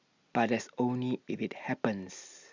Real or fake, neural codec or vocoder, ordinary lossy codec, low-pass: real; none; Opus, 64 kbps; 7.2 kHz